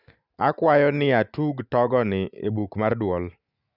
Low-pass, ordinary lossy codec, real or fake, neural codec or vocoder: 5.4 kHz; none; real; none